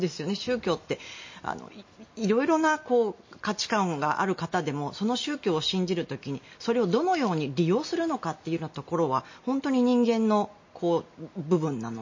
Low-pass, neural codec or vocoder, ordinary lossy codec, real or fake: 7.2 kHz; none; MP3, 32 kbps; real